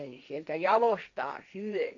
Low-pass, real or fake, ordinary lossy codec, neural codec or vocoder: 7.2 kHz; fake; none; codec, 16 kHz, 1.1 kbps, Voila-Tokenizer